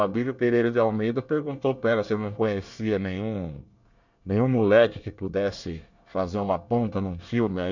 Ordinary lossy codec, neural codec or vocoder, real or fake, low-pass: none; codec, 24 kHz, 1 kbps, SNAC; fake; 7.2 kHz